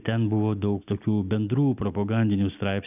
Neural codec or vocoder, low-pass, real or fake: none; 3.6 kHz; real